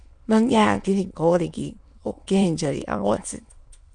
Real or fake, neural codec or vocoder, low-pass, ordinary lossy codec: fake; autoencoder, 22.05 kHz, a latent of 192 numbers a frame, VITS, trained on many speakers; 9.9 kHz; MP3, 64 kbps